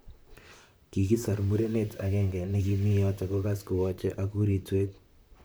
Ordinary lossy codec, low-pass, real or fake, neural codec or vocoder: none; none; fake; vocoder, 44.1 kHz, 128 mel bands, Pupu-Vocoder